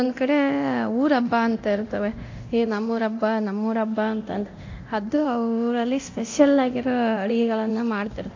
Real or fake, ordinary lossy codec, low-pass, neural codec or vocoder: fake; AAC, 48 kbps; 7.2 kHz; codec, 24 kHz, 0.9 kbps, DualCodec